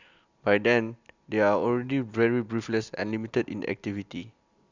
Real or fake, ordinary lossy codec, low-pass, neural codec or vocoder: real; Opus, 64 kbps; 7.2 kHz; none